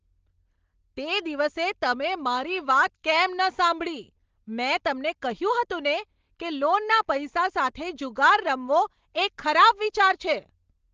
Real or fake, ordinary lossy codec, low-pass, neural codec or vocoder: real; Opus, 16 kbps; 7.2 kHz; none